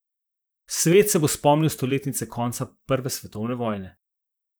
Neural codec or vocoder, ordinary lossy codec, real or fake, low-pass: vocoder, 44.1 kHz, 128 mel bands, Pupu-Vocoder; none; fake; none